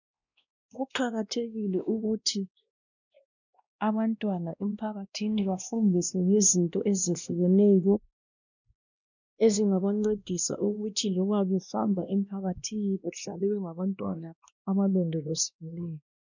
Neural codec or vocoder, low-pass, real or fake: codec, 16 kHz, 1 kbps, X-Codec, WavLM features, trained on Multilingual LibriSpeech; 7.2 kHz; fake